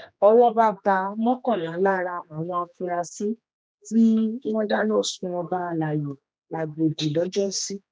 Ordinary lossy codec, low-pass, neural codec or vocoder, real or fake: none; none; codec, 16 kHz, 2 kbps, X-Codec, HuBERT features, trained on general audio; fake